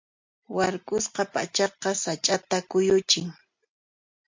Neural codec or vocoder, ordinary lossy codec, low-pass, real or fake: none; MP3, 48 kbps; 7.2 kHz; real